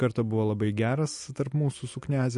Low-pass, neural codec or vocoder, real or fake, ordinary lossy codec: 14.4 kHz; none; real; MP3, 48 kbps